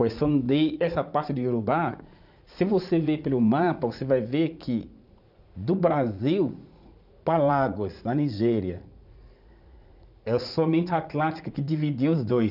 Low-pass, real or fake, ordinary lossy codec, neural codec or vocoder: 5.4 kHz; fake; none; vocoder, 44.1 kHz, 80 mel bands, Vocos